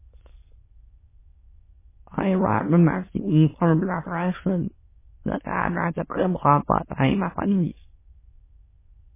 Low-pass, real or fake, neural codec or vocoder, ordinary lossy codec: 3.6 kHz; fake; autoencoder, 22.05 kHz, a latent of 192 numbers a frame, VITS, trained on many speakers; MP3, 16 kbps